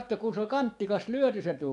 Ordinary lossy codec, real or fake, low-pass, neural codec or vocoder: none; fake; 10.8 kHz; codec, 44.1 kHz, 7.8 kbps, DAC